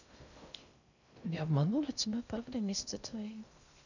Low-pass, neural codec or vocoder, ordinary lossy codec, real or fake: 7.2 kHz; codec, 16 kHz in and 24 kHz out, 0.6 kbps, FocalCodec, streaming, 2048 codes; none; fake